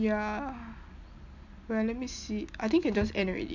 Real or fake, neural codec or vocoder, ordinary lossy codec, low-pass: real; none; none; 7.2 kHz